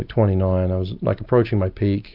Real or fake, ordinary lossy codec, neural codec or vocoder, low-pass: fake; AAC, 48 kbps; vocoder, 44.1 kHz, 128 mel bands every 256 samples, BigVGAN v2; 5.4 kHz